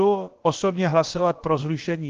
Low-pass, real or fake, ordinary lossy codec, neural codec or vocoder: 7.2 kHz; fake; Opus, 16 kbps; codec, 16 kHz, about 1 kbps, DyCAST, with the encoder's durations